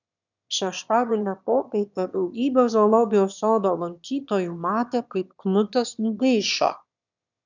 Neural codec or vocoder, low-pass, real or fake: autoencoder, 22.05 kHz, a latent of 192 numbers a frame, VITS, trained on one speaker; 7.2 kHz; fake